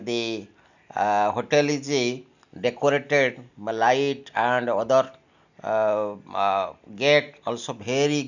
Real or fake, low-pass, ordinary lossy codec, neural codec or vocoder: real; 7.2 kHz; none; none